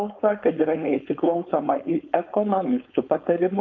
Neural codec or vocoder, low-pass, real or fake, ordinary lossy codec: codec, 16 kHz, 4.8 kbps, FACodec; 7.2 kHz; fake; MP3, 64 kbps